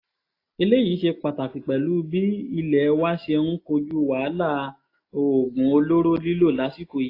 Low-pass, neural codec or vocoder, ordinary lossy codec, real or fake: 5.4 kHz; none; AAC, 32 kbps; real